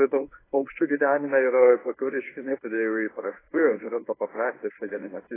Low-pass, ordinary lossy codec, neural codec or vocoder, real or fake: 3.6 kHz; AAC, 16 kbps; codec, 24 kHz, 0.9 kbps, WavTokenizer, medium speech release version 1; fake